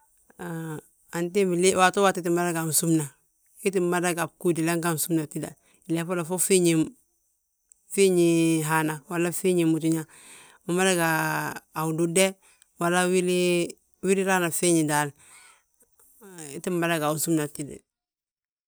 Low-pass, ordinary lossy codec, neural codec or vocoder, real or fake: none; none; none; real